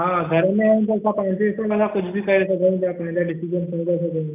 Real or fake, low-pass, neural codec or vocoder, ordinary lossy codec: real; 3.6 kHz; none; none